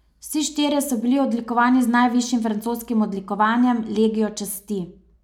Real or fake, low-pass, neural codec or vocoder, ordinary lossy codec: real; 19.8 kHz; none; none